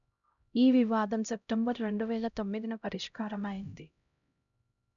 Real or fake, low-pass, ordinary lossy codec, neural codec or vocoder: fake; 7.2 kHz; none; codec, 16 kHz, 0.5 kbps, X-Codec, HuBERT features, trained on LibriSpeech